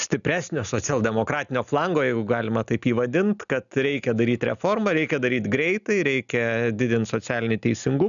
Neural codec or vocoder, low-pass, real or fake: none; 7.2 kHz; real